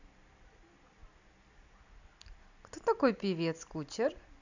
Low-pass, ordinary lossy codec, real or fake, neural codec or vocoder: 7.2 kHz; none; real; none